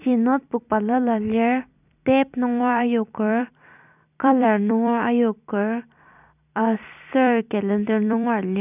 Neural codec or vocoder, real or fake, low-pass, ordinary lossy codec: vocoder, 44.1 kHz, 128 mel bands every 256 samples, BigVGAN v2; fake; 3.6 kHz; none